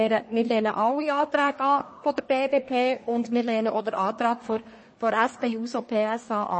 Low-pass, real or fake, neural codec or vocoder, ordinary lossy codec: 9.9 kHz; fake; codec, 24 kHz, 1 kbps, SNAC; MP3, 32 kbps